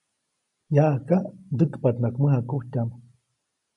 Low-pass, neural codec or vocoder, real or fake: 10.8 kHz; none; real